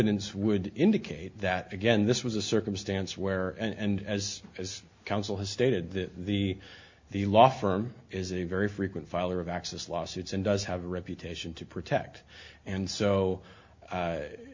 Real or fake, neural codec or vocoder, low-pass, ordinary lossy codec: real; none; 7.2 kHz; MP3, 48 kbps